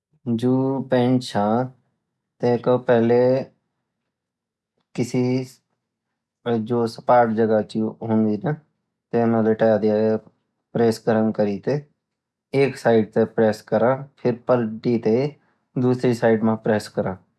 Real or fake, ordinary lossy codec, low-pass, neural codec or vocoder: real; none; none; none